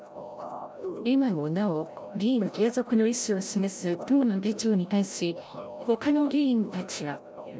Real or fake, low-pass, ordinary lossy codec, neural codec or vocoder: fake; none; none; codec, 16 kHz, 0.5 kbps, FreqCodec, larger model